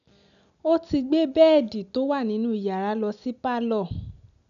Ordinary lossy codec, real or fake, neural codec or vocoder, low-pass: none; real; none; 7.2 kHz